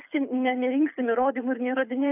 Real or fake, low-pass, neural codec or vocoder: fake; 3.6 kHz; codec, 24 kHz, 6 kbps, HILCodec